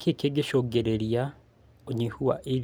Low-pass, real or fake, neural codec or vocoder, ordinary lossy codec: none; real; none; none